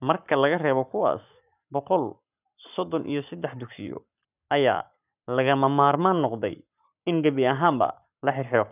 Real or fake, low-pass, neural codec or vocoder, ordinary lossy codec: fake; 3.6 kHz; codec, 44.1 kHz, 7.8 kbps, Pupu-Codec; none